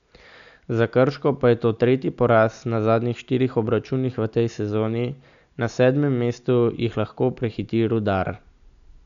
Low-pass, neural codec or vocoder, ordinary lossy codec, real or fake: 7.2 kHz; none; none; real